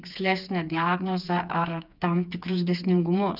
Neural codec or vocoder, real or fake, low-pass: codec, 16 kHz, 4 kbps, FreqCodec, smaller model; fake; 5.4 kHz